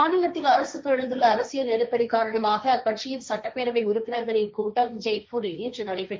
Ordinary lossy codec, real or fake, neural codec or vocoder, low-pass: none; fake; codec, 16 kHz, 1.1 kbps, Voila-Tokenizer; none